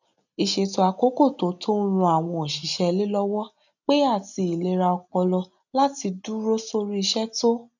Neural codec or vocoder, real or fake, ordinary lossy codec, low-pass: none; real; none; 7.2 kHz